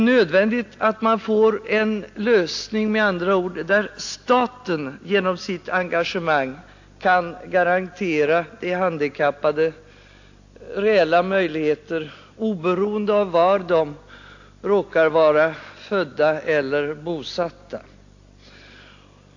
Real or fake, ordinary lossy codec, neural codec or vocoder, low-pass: real; AAC, 48 kbps; none; 7.2 kHz